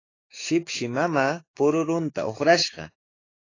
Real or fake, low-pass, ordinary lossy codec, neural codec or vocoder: fake; 7.2 kHz; AAC, 32 kbps; codec, 16 kHz, 6 kbps, DAC